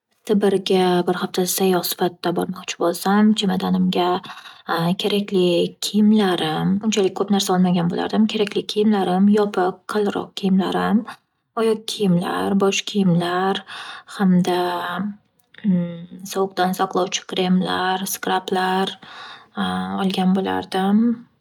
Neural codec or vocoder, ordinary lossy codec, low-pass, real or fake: none; none; 19.8 kHz; real